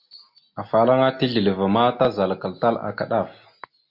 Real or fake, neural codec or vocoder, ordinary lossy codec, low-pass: real; none; MP3, 48 kbps; 5.4 kHz